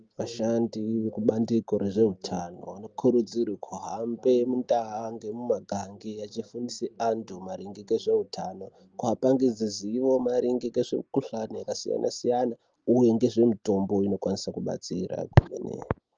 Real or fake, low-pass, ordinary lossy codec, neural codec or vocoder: real; 7.2 kHz; Opus, 24 kbps; none